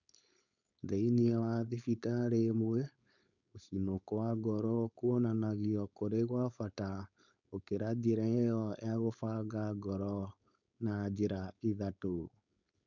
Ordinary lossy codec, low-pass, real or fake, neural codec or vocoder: none; 7.2 kHz; fake; codec, 16 kHz, 4.8 kbps, FACodec